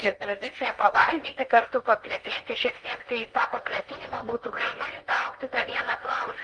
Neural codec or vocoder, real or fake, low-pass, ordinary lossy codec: codec, 16 kHz in and 24 kHz out, 0.8 kbps, FocalCodec, streaming, 65536 codes; fake; 9.9 kHz; Opus, 24 kbps